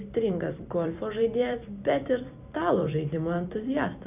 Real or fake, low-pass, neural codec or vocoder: fake; 3.6 kHz; vocoder, 44.1 kHz, 128 mel bands every 256 samples, BigVGAN v2